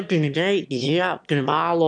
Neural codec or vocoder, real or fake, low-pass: autoencoder, 22.05 kHz, a latent of 192 numbers a frame, VITS, trained on one speaker; fake; 9.9 kHz